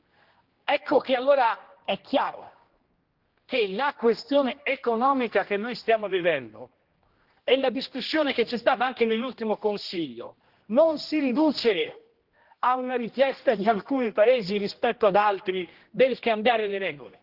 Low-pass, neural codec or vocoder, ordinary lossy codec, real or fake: 5.4 kHz; codec, 16 kHz, 1 kbps, X-Codec, HuBERT features, trained on general audio; Opus, 16 kbps; fake